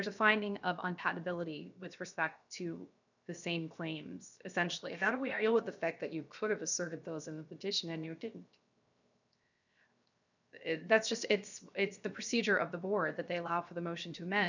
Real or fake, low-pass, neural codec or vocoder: fake; 7.2 kHz; codec, 16 kHz, 0.7 kbps, FocalCodec